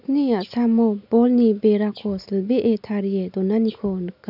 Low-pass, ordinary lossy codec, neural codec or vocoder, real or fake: 5.4 kHz; none; none; real